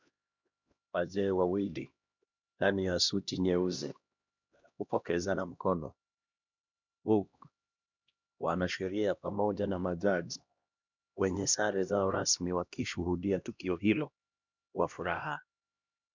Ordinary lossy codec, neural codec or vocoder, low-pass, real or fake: MP3, 64 kbps; codec, 16 kHz, 1 kbps, X-Codec, HuBERT features, trained on LibriSpeech; 7.2 kHz; fake